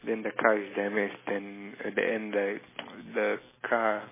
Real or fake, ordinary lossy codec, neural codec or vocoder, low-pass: real; MP3, 16 kbps; none; 3.6 kHz